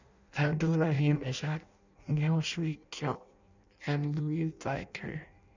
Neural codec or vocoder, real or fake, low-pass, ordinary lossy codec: codec, 16 kHz in and 24 kHz out, 0.6 kbps, FireRedTTS-2 codec; fake; 7.2 kHz; none